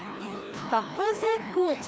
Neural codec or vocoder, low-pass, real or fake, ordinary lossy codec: codec, 16 kHz, 1 kbps, FreqCodec, larger model; none; fake; none